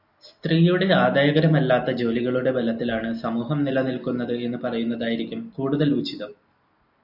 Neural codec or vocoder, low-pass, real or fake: none; 5.4 kHz; real